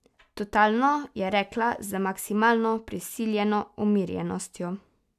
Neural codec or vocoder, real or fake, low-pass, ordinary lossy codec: none; real; 14.4 kHz; none